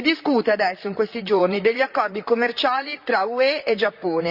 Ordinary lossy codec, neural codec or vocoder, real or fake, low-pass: Opus, 64 kbps; vocoder, 44.1 kHz, 128 mel bands, Pupu-Vocoder; fake; 5.4 kHz